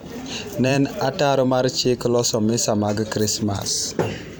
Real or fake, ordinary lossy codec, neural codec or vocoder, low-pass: fake; none; vocoder, 44.1 kHz, 128 mel bands every 512 samples, BigVGAN v2; none